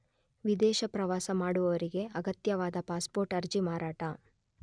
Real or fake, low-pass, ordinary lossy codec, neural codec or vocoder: real; 9.9 kHz; none; none